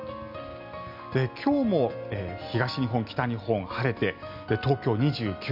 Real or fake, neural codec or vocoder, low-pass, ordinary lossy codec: real; none; 5.4 kHz; none